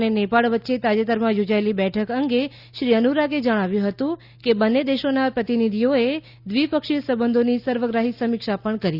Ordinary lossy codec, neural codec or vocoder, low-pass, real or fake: Opus, 64 kbps; none; 5.4 kHz; real